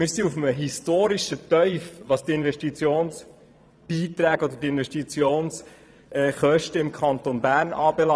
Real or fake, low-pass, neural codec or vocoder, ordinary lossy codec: fake; none; vocoder, 22.05 kHz, 80 mel bands, Vocos; none